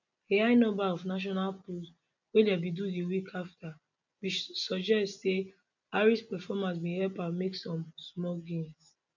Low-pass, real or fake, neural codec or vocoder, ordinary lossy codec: 7.2 kHz; real; none; none